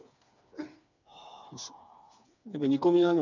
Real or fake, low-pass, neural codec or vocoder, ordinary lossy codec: fake; 7.2 kHz; codec, 16 kHz, 4 kbps, FreqCodec, smaller model; none